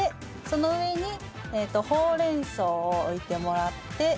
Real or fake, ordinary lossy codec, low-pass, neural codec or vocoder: real; none; none; none